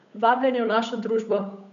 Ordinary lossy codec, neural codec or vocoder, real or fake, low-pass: none; codec, 16 kHz, 8 kbps, FunCodec, trained on Chinese and English, 25 frames a second; fake; 7.2 kHz